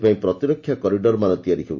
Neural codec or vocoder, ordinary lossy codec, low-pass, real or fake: none; Opus, 64 kbps; 7.2 kHz; real